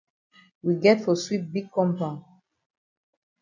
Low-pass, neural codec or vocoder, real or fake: 7.2 kHz; none; real